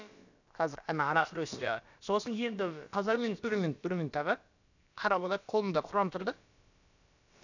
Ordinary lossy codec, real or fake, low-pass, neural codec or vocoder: none; fake; 7.2 kHz; codec, 16 kHz, about 1 kbps, DyCAST, with the encoder's durations